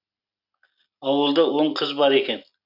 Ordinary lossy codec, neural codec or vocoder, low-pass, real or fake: none; none; 5.4 kHz; real